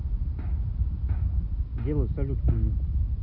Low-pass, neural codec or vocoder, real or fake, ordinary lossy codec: 5.4 kHz; none; real; Opus, 64 kbps